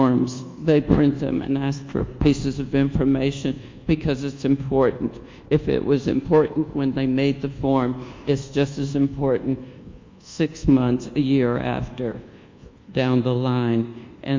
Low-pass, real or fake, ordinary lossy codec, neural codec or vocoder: 7.2 kHz; fake; MP3, 48 kbps; codec, 24 kHz, 1.2 kbps, DualCodec